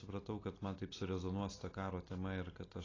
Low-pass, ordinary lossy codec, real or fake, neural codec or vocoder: 7.2 kHz; AAC, 32 kbps; real; none